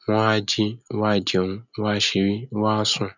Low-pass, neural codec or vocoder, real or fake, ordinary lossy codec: 7.2 kHz; none; real; none